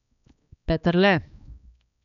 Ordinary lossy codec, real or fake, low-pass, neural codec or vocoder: none; fake; 7.2 kHz; codec, 16 kHz, 2 kbps, X-Codec, HuBERT features, trained on balanced general audio